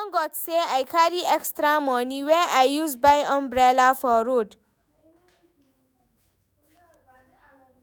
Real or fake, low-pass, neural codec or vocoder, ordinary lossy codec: fake; none; autoencoder, 48 kHz, 128 numbers a frame, DAC-VAE, trained on Japanese speech; none